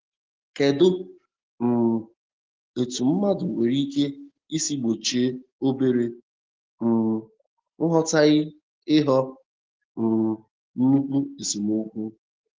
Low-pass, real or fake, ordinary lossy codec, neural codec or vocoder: 7.2 kHz; real; Opus, 16 kbps; none